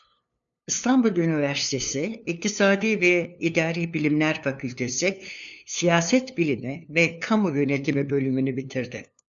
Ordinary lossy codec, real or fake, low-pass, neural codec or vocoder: MP3, 96 kbps; fake; 7.2 kHz; codec, 16 kHz, 2 kbps, FunCodec, trained on LibriTTS, 25 frames a second